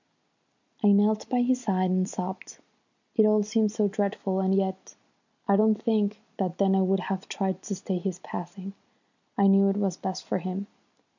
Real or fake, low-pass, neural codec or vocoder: real; 7.2 kHz; none